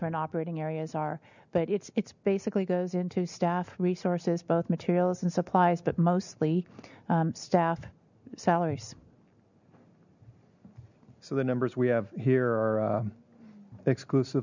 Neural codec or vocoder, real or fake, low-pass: none; real; 7.2 kHz